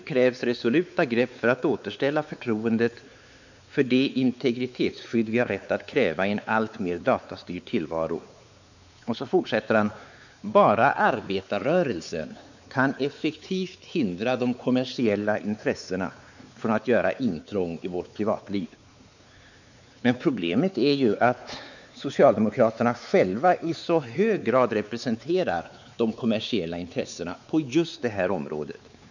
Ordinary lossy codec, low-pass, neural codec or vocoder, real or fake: none; 7.2 kHz; codec, 16 kHz, 4 kbps, X-Codec, WavLM features, trained on Multilingual LibriSpeech; fake